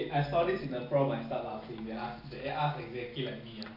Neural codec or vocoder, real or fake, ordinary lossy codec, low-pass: none; real; none; 5.4 kHz